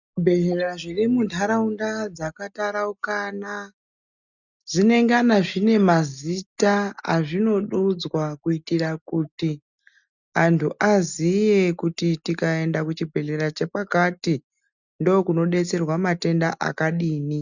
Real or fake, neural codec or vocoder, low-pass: real; none; 7.2 kHz